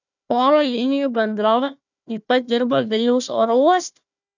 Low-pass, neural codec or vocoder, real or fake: 7.2 kHz; codec, 16 kHz, 1 kbps, FunCodec, trained on Chinese and English, 50 frames a second; fake